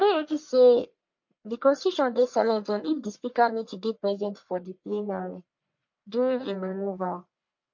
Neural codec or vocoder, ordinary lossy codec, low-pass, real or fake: codec, 44.1 kHz, 1.7 kbps, Pupu-Codec; MP3, 48 kbps; 7.2 kHz; fake